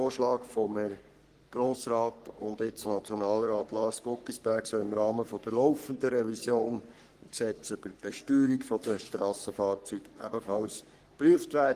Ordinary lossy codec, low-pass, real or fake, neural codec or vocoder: Opus, 24 kbps; 14.4 kHz; fake; codec, 44.1 kHz, 3.4 kbps, Pupu-Codec